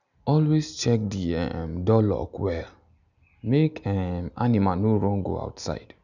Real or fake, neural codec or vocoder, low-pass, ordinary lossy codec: real; none; 7.2 kHz; none